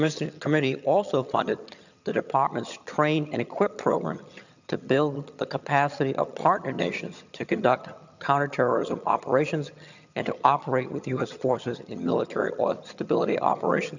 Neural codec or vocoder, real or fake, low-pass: vocoder, 22.05 kHz, 80 mel bands, HiFi-GAN; fake; 7.2 kHz